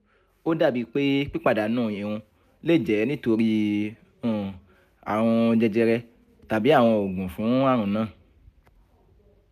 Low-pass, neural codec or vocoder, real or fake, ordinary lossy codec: 14.4 kHz; none; real; none